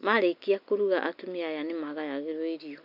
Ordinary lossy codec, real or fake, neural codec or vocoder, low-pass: none; real; none; 5.4 kHz